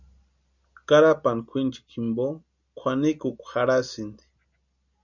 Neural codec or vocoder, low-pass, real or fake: none; 7.2 kHz; real